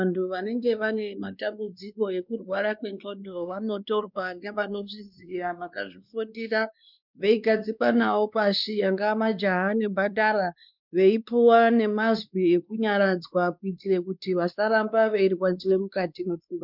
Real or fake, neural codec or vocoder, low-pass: fake; codec, 16 kHz, 2 kbps, X-Codec, WavLM features, trained on Multilingual LibriSpeech; 5.4 kHz